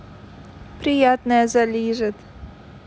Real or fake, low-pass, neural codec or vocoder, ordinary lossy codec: real; none; none; none